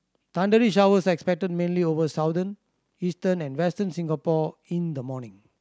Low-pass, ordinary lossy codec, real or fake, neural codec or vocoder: none; none; real; none